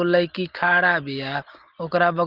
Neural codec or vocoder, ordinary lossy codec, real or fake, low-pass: none; Opus, 16 kbps; real; 5.4 kHz